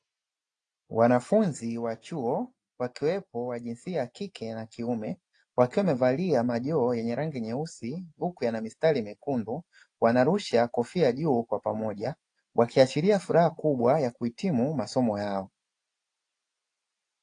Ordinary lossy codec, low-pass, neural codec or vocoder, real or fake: AAC, 48 kbps; 9.9 kHz; none; real